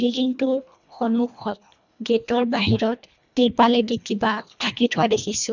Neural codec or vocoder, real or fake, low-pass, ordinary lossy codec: codec, 24 kHz, 1.5 kbps, HILCodec; fake; 7.2 kHz; none